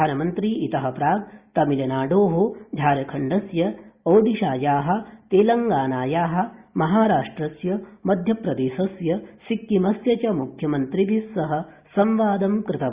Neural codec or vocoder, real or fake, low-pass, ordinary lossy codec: none; real; 3.6 kHz; Opus, 64 kbps